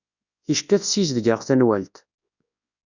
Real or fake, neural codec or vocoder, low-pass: fake; codec, 24 kHz, 0.9 kbps, WavTokenizer, large speech release; 7.2 kHz